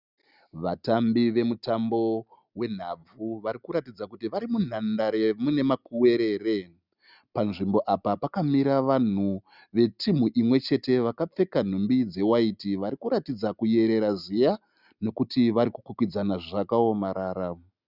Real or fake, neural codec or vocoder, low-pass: real; none; 5.4 kHz